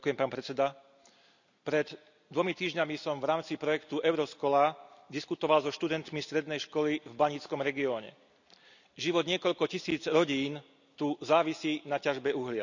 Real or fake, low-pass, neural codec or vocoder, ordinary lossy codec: real; 7.2 kHz; none; none